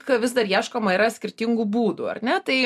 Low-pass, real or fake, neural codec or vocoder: 14.4 kHz; real; none